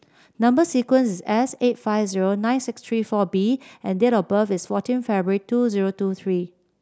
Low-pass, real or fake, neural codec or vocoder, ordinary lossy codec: none; real; none; none